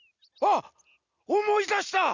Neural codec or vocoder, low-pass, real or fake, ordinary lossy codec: none; 7.2 kHz; real; none